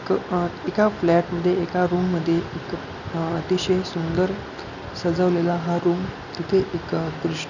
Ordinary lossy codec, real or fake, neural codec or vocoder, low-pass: none; real; none; 7.2 kHz